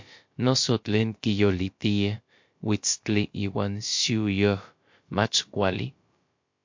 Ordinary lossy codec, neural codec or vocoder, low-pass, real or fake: MP3, 48 kbps; codec, 16 kHz, about 1 kbps, DyCAST, with the encoder's durations; 7.2 kHz; fake